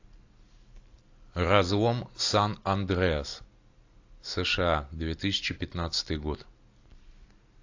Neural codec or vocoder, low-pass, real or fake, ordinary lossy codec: none; 7.2 kHz; real; AAC, 48 kbps